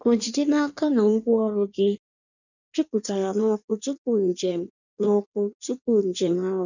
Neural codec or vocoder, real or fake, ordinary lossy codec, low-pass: codec, 16 kHz in and 24 kHz out, 1.1 kbps, FireRedTTS-2 codec; fake; none; 7.2 kHz